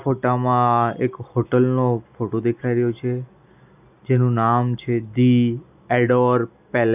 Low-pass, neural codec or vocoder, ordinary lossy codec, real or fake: 3.6 kHz; none; none; real